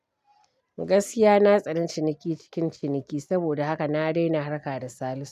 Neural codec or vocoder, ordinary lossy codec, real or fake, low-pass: none; none; real; 14.4 kHz